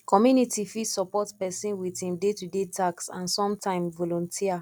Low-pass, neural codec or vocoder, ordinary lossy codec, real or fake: 19.8 kHz; none; none; real